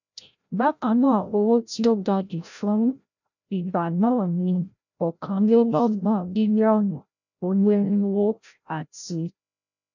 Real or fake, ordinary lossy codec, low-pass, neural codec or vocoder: fake; none; 7.2 kHz; codec, 16 kHz, 0.5 kbps, FreqCodec, larger model